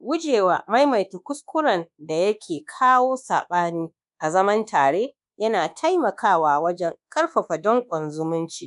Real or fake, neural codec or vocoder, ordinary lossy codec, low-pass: fake; codec, 24 kHz, 1.2 kbps, DualCodec; none; 10.8 kHz